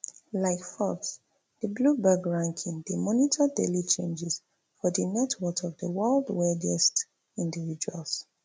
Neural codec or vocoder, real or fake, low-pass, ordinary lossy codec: none; real; none; none